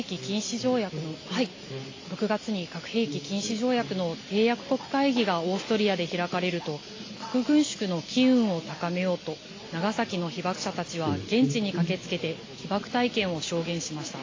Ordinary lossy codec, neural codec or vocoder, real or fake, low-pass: AAC, 32 kbps; none; real; 7.2 kHz